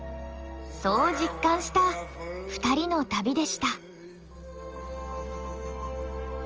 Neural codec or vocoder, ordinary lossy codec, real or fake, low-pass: none; Opus, 24 kbps; real; 7.2 kHz